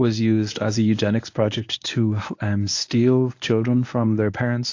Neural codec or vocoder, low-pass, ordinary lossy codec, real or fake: codec, 24 kHz, 0.9 kbps, WavTokenizer, medium speech release version 1; 7.2 kHz; AAC, 48 kbps; fake